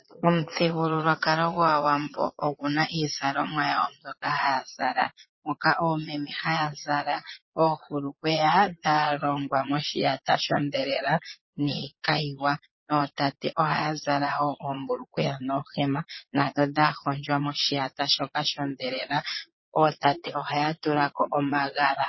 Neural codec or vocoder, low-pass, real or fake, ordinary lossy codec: vocoder, 22.05 kHz, 80 mel bands, Vocos; 7.2 kHz; fake; MP3, 24 kbps